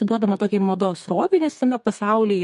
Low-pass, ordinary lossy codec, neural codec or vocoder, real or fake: 14.4 kHz; MP3, 48 kbps; codec, 32 kHz, 1.9 kbps, SNAC; fake